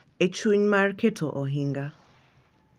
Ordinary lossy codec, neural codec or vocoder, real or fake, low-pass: Opus, 32 kbps; none; real; 14.4 kHz